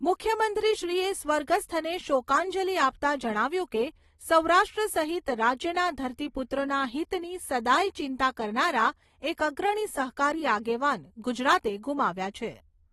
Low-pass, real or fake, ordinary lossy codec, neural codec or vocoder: 19.8 kHz; real; AAC, 32 kbps; none